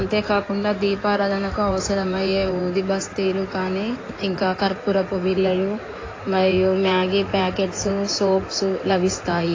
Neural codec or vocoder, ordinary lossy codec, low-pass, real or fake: codec, 16 kHz in and 24 kHz out, 2.2 kbps, FireRedTTS-2 codec; AAC, 32 kbps; 7.2 kHz; fake